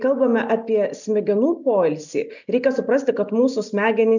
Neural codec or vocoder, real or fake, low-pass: none; real; 7.2 kHz